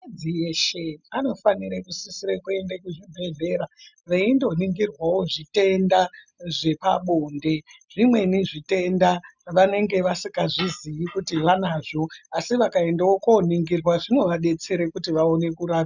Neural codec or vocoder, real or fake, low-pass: none; real; 7.2 kHz